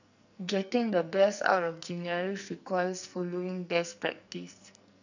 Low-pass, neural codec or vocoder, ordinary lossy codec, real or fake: 7.2 kHz; codec, 44.1 kHz, 2.6 kbps, SNAC; none; fake